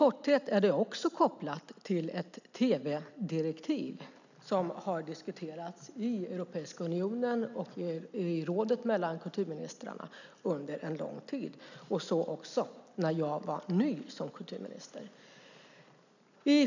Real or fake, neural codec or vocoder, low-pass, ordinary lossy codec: real; none; 7.2 kHz; none